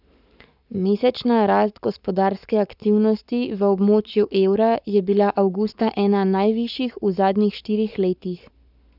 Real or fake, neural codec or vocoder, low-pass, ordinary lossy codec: fake; codec, 44.1 kHz, 7.8 kbps, Pupu-Codec; 5.4 kHz; none